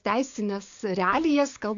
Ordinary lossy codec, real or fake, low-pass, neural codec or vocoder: AAC, 48 kbps; fake; 7.2 kHz; codec, 16 kHz, 6 kbps, DAC